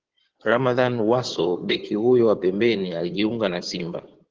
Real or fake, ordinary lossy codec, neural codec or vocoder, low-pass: fake; Opus, 16 kbps; codec, 16 kHz in and 24 kHz out, 2.2 kbps, FireRedTTS-2 codec; 7.2 kHz